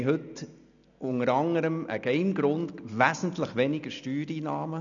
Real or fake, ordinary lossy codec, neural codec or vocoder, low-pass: real; none; none; 7.2 kHz